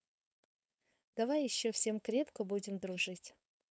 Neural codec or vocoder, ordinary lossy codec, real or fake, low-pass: codec, 16 kHz, 4.8 kbps, FACodec; none; fake; none